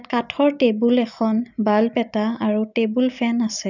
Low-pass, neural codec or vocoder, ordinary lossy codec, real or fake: 7.2 kHz; none; none; real